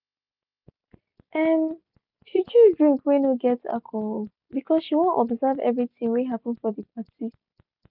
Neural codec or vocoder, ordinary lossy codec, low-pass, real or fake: none; none; 5.4 kHz; real